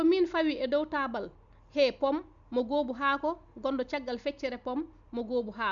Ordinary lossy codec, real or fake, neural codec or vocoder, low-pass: none; real; none; 7.2 kHz